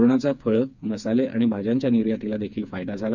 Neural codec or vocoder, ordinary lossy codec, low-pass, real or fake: codec, 16 kHz, 4 kbps, FreqCodec, smaller model; none; 7.2 kHz; fake